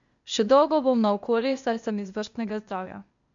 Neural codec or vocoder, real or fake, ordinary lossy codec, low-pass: codec, 16 kHz, 0.8 kbps, ZipCodec; fake; MP3, 64 kbps; 7.2 kHz